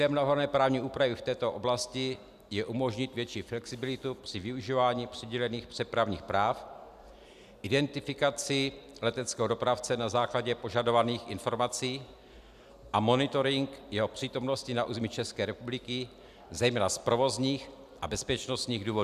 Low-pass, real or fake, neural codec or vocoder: 14.4 kHz; real; none